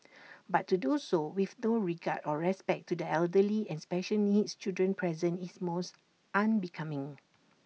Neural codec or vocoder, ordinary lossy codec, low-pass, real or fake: none; none; none; real